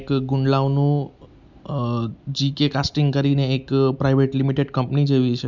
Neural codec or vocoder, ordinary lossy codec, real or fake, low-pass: none; MP3, 64 kbps; real; 7.2 kHz